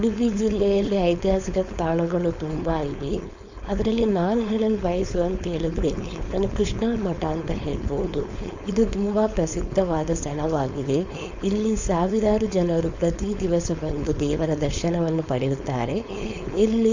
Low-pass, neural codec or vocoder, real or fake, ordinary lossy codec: 7.2 kHz; codec, 16 kHz, 4.8 kbps, FACodec; fake; Opus, 64 kbps